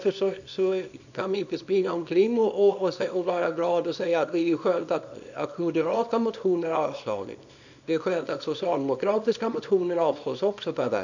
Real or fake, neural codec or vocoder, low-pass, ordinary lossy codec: fake; codec, 24 kHz, 0.9 kbps, WavTokenizer, small release; 7.2 kHz; none